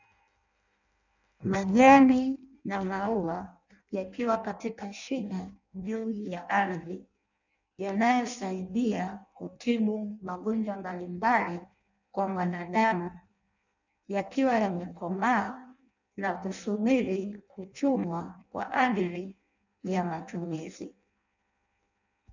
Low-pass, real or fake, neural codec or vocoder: 7.2 kHz; fake; codec, 16 kHz in and 24 kHz out, 0.6 kbps, FireRedTTS-2 codec